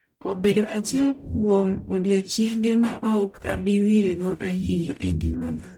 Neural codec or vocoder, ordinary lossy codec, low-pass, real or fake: codec, 44.1 kHz, 0.9 kbps, DAC; none; 19.8 kHz; fake